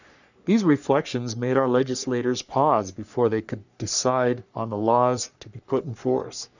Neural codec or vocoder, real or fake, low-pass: codec, 44.1 kHz, 3.4 kbps, Pupu-Codec; fake; 7.2 kHz